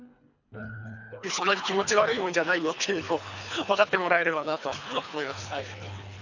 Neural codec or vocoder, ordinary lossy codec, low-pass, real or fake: codec, 24 kHz, 3 kbps, HILCodec; none; 7.2 kHz; fake